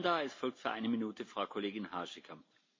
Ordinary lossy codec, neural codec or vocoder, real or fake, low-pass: MP3, 32 kbps; none; real; 7.2 kHz